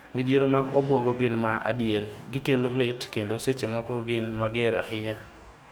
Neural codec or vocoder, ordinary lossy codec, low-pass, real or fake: codec, 44.1 kHz, 2.6 kbps, DAC; none; none; fake